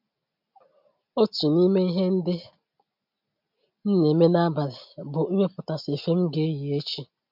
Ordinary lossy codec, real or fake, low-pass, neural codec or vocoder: MP3, 48 kbps; real; 5.4 kHz; none